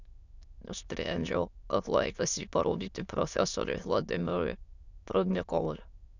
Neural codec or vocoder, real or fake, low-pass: autoencoder, 22.05 kHz, a latent of 192 numbers a frame, VITS, trained on many speakers; fake; 7.2 kHz